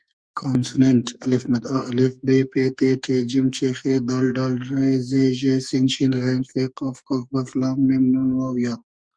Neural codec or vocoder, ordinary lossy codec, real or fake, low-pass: codec, 44.1 kHz, 2.6 kbps, SNAC; Opus, 64 kbps; fake; 9.9 kHz